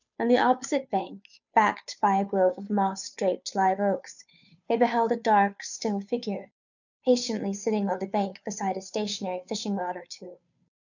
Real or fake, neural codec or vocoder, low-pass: fake; codec, 16 kHz, 2 kbps, FunCodec, trained on Chinese and English, 25 frames a second; 7.2 kHz